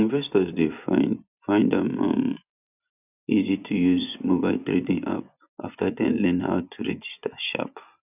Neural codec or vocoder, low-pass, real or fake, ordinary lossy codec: vocoder, 24 kHz, 100 mel bands, Vocos; 3.6 kHz; fake; none